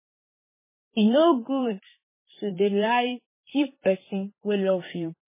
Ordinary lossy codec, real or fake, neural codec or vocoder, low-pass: MP3, 16 kbps; fake; vocoder, 44.1 kHz, 128 mel bands, Pupu-Vocoder; 3.6 kHz